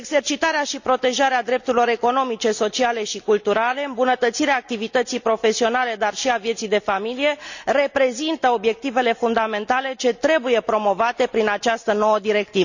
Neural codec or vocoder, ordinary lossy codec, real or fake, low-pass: none; none; real; 7.2 kHz